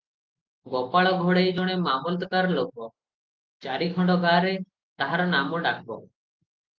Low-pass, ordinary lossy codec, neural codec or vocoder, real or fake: 7.2 kHz; Opus, 32 kbps; none; real